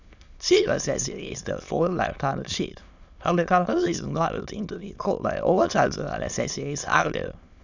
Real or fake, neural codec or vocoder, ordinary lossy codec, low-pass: fake; autoencoder, 22.05 kHz, a latent of 192 numbers a frame, VITS, trained on many speakers; none; 7.2 kHz